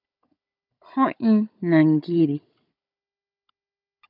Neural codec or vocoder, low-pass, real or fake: codec, 16 kHz, 16 kbps, FunCodec, trained on Chinese and English, 50 frames a second; 5.4 kHz; fake